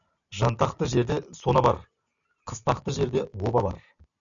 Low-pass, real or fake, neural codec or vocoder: 7.2 kHz; real; none